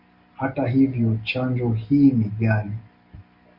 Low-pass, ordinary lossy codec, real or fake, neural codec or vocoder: 5.4 kHz; Opus, 64 kbps; real; none